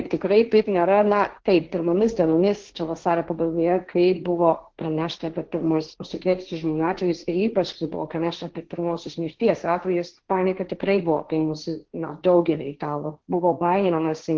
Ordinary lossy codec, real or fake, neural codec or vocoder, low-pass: Opus, 16 kbps; fake; codec, 16 kHz, 1.1 kbps, Voila-Tokenizer; 7.2 kHz